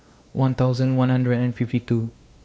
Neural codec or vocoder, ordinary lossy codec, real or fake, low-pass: codec, 16 kHz, 2 kbps, X-Codec, WavLM features, trained on Multilingual LibriSpeech; none; fake; none